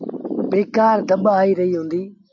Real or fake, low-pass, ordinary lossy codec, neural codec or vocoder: real; 7.2 kHz; AAC, 48 kbps; none